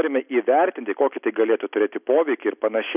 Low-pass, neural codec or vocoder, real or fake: 3.6 kHz; none; real